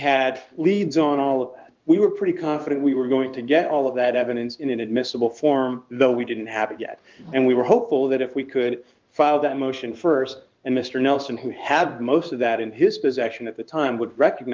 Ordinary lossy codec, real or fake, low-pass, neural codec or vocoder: Opus, 32 kbps; fake; 7.2 kHz; codec, 16 kHz in and 24 kHz out, 1 kbps, XY-Tokenizer